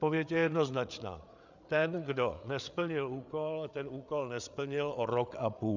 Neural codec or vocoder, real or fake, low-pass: codec, 16 kHz, 8 kbps, FreqCodec, larger model; fake; 7.2 kHz